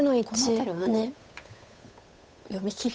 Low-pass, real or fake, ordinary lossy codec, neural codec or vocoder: none; fake; none; codec, 16 kHz, 8 kbps, FunCodec, trained on Chinese and English, 25 frames a second